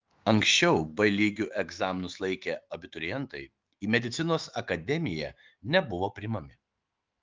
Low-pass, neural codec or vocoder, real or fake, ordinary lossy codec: 7.2 kHz; autoencoder, 48 kHz, 128 numbers a frame, DAC-VAE, trained on Japanese speech; fake; Opus, 32 kbps